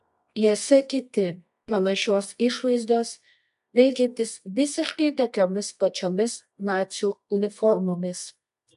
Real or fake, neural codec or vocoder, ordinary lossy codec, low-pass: fake; codec, 24 kHz, 0.9 kbps, WavTokenizer, medium music audio release; AAC, 96 kbps; 10.8 kHz